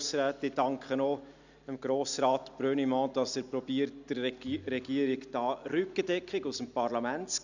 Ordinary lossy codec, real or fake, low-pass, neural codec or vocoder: none; real; 7.2 kHz; none